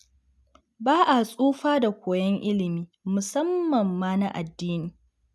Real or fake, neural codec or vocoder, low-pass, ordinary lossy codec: real; none; none; none